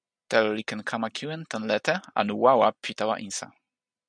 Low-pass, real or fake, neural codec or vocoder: 9.9 kHz; real; none